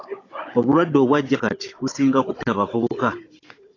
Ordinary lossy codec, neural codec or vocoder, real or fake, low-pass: AAC, 32 kbps; codec, 16 kHz, 16 kbps, FunCodec, trained on Chinese and English, 50 frames a second; fake; 7.2 kHz